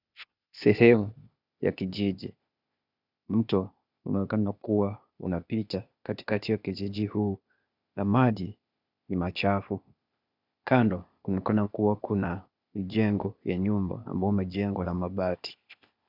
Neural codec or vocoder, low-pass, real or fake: codec, 16 kHz, 0.8 kbps, ZipCodec; 5.4 kHz; fake